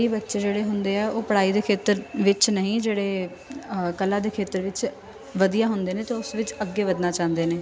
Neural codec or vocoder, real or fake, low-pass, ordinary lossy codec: none; real; none; none